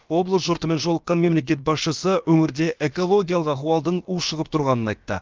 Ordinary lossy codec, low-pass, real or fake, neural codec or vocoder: Opus, 32 kbps; 7.2 kHz; fake; codec, 16 kHz, about 1 kbps, DyCAST, with the encoder's durations